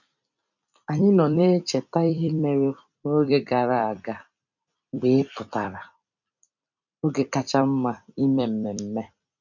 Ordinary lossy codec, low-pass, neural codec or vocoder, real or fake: none; 7.2 kHz; vocoder, 44.1 kHz, 128 mel bands every 256 samples, BigVGAN v2; fake